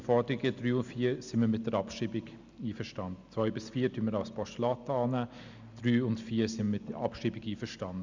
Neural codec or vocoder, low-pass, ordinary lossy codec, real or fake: none; 7.2 kHz; Opus, 64 kbps; real